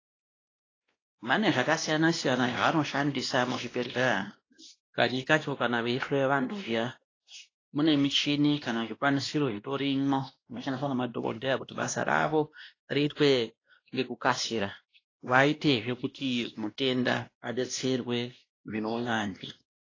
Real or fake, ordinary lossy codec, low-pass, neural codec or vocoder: fake; AAC, 32 kbps; 7.2 kHz; codec, 16 kHz, 1 kbps, X-Codec, WavLM features, trained on Multilingual LibriSpeech